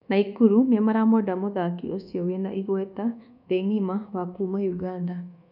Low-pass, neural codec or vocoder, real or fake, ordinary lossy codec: 5.4 kHz; codec, 24 kHz, 1.2 kbps, DualCodec; fake; none